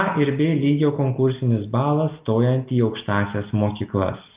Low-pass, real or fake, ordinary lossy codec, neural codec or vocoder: 3.6 kHz; real; Opus, 32 kbps; none